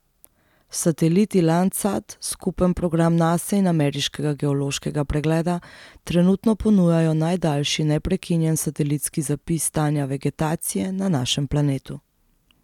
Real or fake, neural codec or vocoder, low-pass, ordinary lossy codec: real; none; 19.8 kHz; none